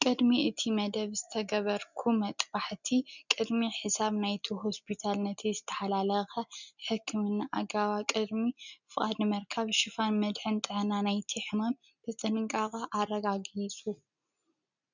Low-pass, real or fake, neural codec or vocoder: 7.2 kHz; real; none